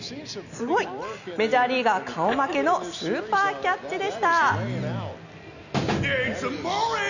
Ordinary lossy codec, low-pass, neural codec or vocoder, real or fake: none; 7.2 kHz; none; real